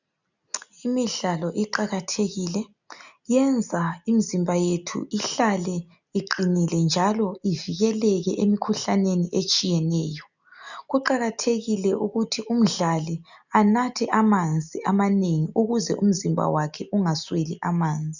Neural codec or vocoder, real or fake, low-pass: none; real; 7.2 kHz